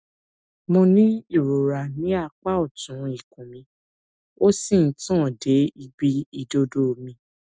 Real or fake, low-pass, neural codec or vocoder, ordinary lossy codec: real; none; none; none